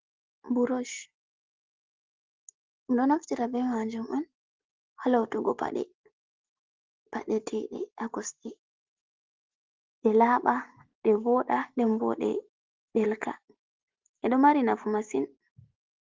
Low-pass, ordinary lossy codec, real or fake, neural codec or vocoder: 7.2 kHz; Opus, 16 kbps; real; none